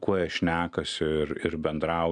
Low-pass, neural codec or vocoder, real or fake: 9.9 kHz; none; real